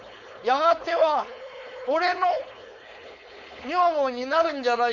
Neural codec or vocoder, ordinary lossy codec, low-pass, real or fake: codec, 16 kHz, 4.8 kbps, FACodec; none; 7.2 kHz; fake